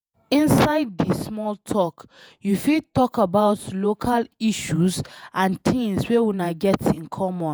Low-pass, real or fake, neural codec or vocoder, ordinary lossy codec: none; fake; vocoder, 48 kHz, 128 mel bands, Vocos; none